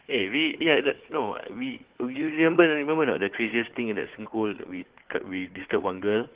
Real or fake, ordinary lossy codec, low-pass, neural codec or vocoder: fake; Opus, 16 kbps; 3.6 kHz; codec, 16 kHz, 4 kbps, FunCodec, trained on Chinese and English, 50 frames a second